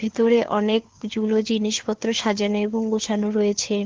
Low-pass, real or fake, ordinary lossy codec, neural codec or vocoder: 7.2 kHz; fake; Opus, 16 kbps; codec, 16 kHz, 4 kbps, FunCodec, trained on LibriTTS, 50 frames a second